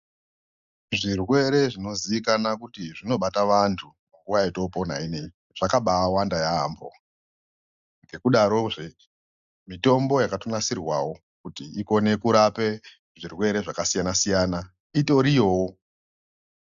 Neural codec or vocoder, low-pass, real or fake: none; 7.2 kHz; real